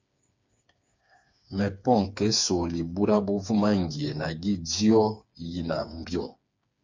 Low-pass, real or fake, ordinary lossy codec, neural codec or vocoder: 7.2 kHz; fake; MP3, 64 kbps; codec, 16 kHz, 4 kbps, FreqCodec, smaller model